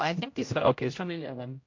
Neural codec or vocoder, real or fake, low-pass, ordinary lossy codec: codec, 16 kHz, 0.5 kbps, X-Codec, HuBERT features, trained on general audio; fake; 7.2 kHz; MP3, 48 kbps